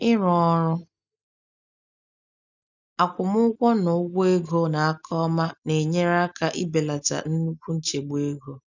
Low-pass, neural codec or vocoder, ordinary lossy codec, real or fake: 7.2 kHz; none; none; real